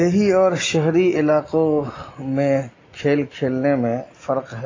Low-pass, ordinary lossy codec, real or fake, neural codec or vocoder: 7.2 kHz; AAC, 32 kbps; real; none